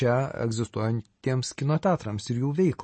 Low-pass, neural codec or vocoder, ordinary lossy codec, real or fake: 9.9 kHz; none; MP3, 32 kbps; real